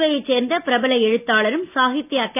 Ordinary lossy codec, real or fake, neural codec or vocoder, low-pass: none; real; none; 3.6 kHz